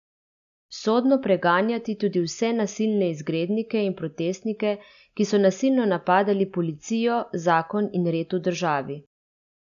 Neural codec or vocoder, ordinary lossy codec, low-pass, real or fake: none; MP3, 96 kbps; 7.2 kHz; real